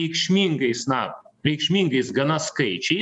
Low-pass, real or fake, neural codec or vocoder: 10.8 kHz; fake; vocoder, 24 kHz, 100 mel bands, Vocos